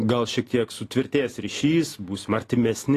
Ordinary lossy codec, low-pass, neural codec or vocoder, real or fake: AAC, 48 kbps; 14.4 kHz; none; real